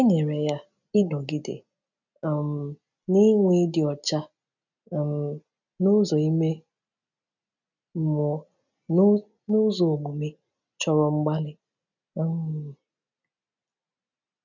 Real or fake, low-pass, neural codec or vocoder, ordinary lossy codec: real; 7.2 kHz; none; none